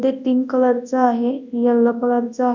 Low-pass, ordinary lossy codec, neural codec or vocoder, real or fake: 7.2 kHz; none; codec, 24 kHz, 0.9 kbps, WavTokenizer, large speech release; fake